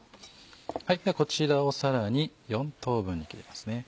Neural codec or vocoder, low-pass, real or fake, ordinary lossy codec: none; none; real; none